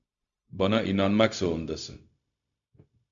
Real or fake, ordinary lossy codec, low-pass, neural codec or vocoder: fake; MP3, 64 kbps; 7.2 kHz; codec, 16 kHz, 0.4 kbps, LongCat-Audio-Codec